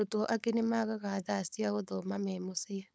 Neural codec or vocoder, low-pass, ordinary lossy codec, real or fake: codec, 16 kHz, 4.8 kbps, FACodec; none; none; fake